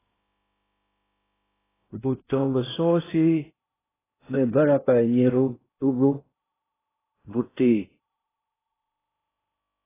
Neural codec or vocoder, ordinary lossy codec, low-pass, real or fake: codec, 16 kHz in and 24 kHz out, 0.6 kbps, FocalCodec, streaming, 2048 codes; AAC, 16 kbps; 3.6 kHz; fake